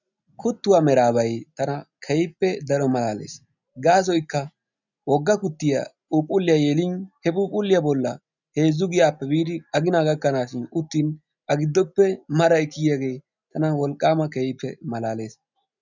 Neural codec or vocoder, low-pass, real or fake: none; 7.2 kHz; real